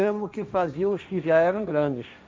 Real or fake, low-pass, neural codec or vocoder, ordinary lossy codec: fake; none; codec, 16 kHz, 1.1 kbps, Voila-Tokenizer; none